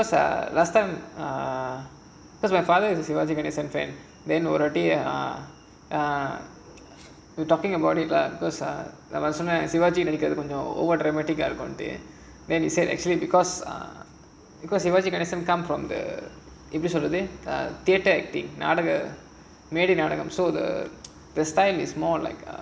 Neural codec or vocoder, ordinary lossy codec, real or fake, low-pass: none; none; real; none